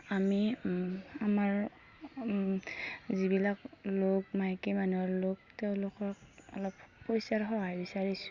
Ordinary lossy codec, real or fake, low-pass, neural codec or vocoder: Opus, 64 kbps; real; 7.2 kHz; none